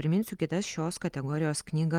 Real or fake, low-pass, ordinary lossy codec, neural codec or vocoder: real; 19.8 kHz; Opus, 32 kbps; none